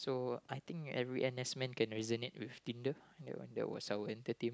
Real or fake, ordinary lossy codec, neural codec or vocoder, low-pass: real; none; none; none